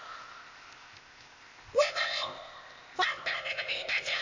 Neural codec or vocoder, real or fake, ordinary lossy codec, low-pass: codec, 16 kHz, 0.8 kbps, ZipCodec; fake; MP3, 64 kbps; 7.2 kHz